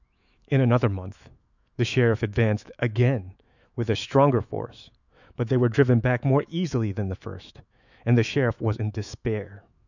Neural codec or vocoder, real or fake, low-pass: autoencoder, 48 kHz, 128 numbers a frame, DAC-VAE, trained on Japanese speech; fake; 7.2 kHz